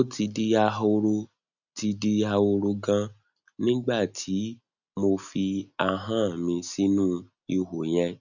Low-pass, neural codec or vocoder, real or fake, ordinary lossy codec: 7.2 kHz; none; real; none